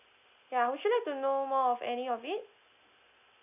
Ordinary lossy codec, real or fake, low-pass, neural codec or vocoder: none; real; 3.6 kHz; none